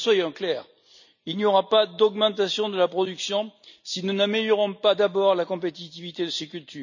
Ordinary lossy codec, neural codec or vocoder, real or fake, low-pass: none; none; real; 7.2 kHz